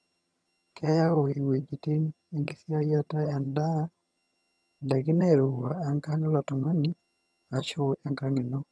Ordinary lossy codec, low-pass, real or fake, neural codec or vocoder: none; none; fake; vocoder, 22.05 kHz, 80 mel bands, HiFi-GAN